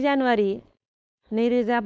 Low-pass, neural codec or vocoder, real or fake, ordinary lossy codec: none; codec, 16 kHz, 4.8 kbps, FACodec; fake; none